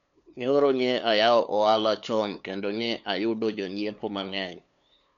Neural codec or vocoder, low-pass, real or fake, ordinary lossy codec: codec, 16 kHz, 2 kbps, FunCodec, trained on LibriTTS, 25 frames a second; 7.2 kHz; fake; none